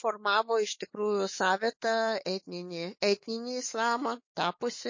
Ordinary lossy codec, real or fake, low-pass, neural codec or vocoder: MP3, 32 kbps; fake; 7.2 kHz; autoencoder, 48 kHz, 128 numbers a frame, DAC-VAE, trained on Japanese speech